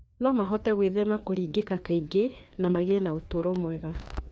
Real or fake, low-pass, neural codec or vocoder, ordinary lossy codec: fake; none; codec, 16 kHz, 2 kbps, FreqCodec, larger model; none